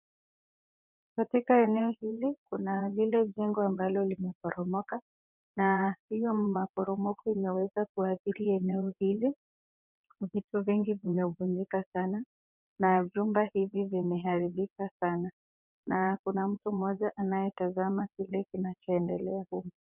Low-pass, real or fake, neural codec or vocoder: 3.6 kHz; fake; vocoder, 22.05 kHz, 80 mel bands, WaveNeXt